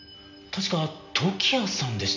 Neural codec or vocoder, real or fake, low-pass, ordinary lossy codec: none; real; 7.2 kHz; none